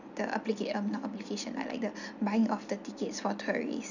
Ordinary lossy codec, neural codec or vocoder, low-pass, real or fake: none; none; 7.2 kHz; real